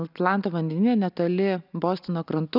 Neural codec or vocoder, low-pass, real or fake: none; 5.4 kHz; real